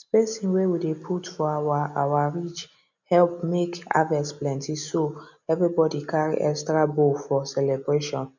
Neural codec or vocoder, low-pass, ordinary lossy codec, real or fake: none; 7.2 kHz; none; real